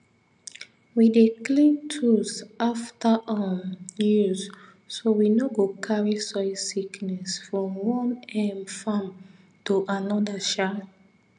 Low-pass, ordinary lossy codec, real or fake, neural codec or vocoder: 9.9 kHz; none; real; none